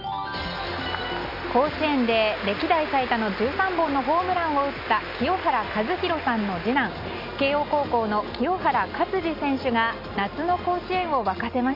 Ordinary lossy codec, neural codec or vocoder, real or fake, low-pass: none; none; real; 5.4 kHz